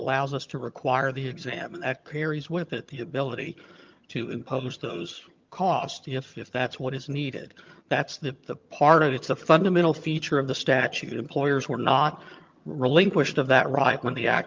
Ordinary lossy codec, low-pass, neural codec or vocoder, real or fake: Opus, 24 kbps; 7.2 kHz; vocoder, 22.05 kHz, 80 mel bands, HiFi-GAN; fake